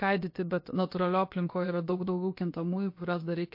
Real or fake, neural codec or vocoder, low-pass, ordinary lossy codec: fake; codec, 16 kHz, about 1 kbps, DyCAST, with the encoder's durations; 5.4 kHz; MP3, 32 kbps